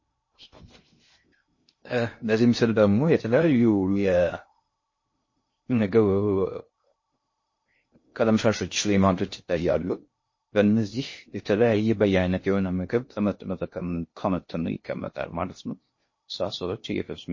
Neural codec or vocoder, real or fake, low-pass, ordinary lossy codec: codec, 16 kHz in and 24 kHz out, 0.6 kbps, FocalCodec, streaming, 2048 codes; fake; 7.2 kHz; MP3, 32 kbps